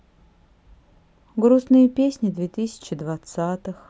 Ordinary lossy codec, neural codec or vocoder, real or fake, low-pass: none; none; real; none